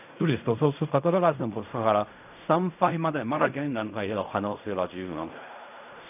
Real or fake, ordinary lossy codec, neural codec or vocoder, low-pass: fake; none; codec, 16 kHz in and 24 kHz out, 0.4 kbps, LongCat-Audio-Codec, fine tuned four codebook decoder; 3.6 kHz